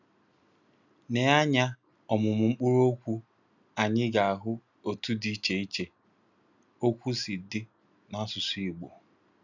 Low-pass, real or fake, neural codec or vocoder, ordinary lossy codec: 7.2 kHz; real; none; none